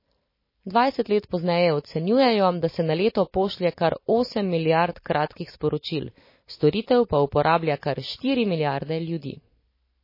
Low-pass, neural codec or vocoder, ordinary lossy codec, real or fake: 5.4 kHz; none; MP3, 24 kbps; real